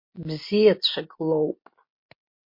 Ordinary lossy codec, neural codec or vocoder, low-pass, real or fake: MP3, 32 kbps; none; 5.4 kHz; real